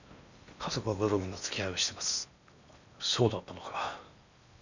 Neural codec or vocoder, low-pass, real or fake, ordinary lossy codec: codec, 16 kHz in and 24 kHz out, 0.8 kbps, FocalCodec, streaming, 65536 codes; 7.2 kHz; fake; none